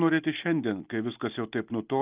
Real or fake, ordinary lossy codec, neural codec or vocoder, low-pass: real; Opus, 32 kbps; none; 3.6 kHz